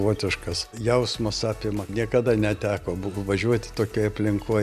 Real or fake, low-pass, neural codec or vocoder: real; 14.4 kHz; none